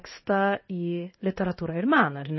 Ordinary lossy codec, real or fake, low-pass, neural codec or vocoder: MP3, 24 kbps; fake; 7.2 kHz; codec, 16 kHz in and 24 kHz out, 1 kbps, XY-Tokenizer